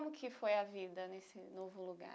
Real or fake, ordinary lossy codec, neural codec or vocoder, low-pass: real; none; none; none